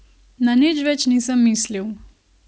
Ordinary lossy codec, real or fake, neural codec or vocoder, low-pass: none; real; none; none